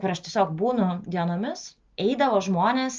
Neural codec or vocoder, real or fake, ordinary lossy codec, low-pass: none; real; Opus, 24 kbps; 7.2 kHz